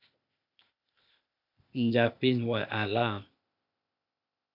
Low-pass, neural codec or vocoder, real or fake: 5.4 kHz; codec, 16 kHz, 0.8 kbps, ZipCodec; fake